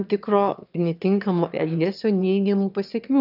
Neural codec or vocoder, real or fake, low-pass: autoencoder, 22.05 kHz, a latent of 192 numbers a frame, VITS, trained on one speaker; fake; 5.4 kHz